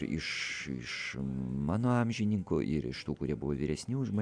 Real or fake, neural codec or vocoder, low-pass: real; none; 9.9 kHz